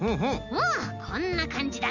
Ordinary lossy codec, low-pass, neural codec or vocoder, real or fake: none; 7.2 kHz; none; real